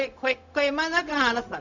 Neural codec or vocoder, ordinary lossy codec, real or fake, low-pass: codec, 16 kHz, 0.4 kbps, LongCat-Audio-Codec; none; fake; 7.2 kHz